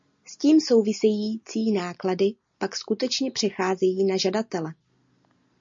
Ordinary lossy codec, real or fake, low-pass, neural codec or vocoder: MP3, 48 kbps; real; 7.2 kHz; none